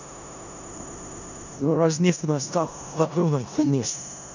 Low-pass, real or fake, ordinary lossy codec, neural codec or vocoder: 7.2 kHz; fake; none; codec, 16 kHz in and 24 kHz out, 0.4 kbps, LongCat-Audio-Codec, four codebook decoder